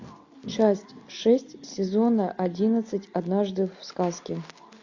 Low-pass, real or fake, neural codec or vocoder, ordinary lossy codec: 7.2 kHz; real; none; AAC, 48 kbps